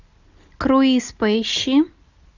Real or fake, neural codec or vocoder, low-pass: real; none; 7.2 kHz